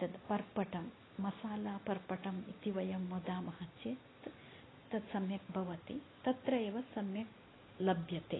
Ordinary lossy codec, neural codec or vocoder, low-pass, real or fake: AAC, 16 kbps; none; 7.2 kHz; real